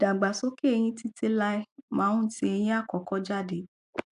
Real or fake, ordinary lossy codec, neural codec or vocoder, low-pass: real; AAC, 96 kbps; none; 10.8 kHz